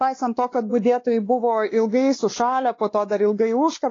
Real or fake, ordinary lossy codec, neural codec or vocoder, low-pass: fake; AAC, 32 kbps; codec, 16 kHz, 2 kbps, X-Codec, WavLM features, trained on Multilingual LibriSpeech; 7.2 kHz